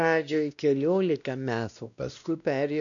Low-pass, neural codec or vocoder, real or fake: 7.2 kHz; codec, 16 kHz, 1 kbps, X-Codec, WavLM features, trained on Multilingual LibriSpeech; fake